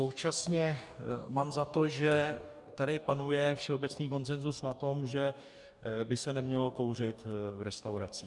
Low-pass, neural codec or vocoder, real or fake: 10.8 kHz; codec, 44.1 kHz, 2.6 kbps, DAC; fake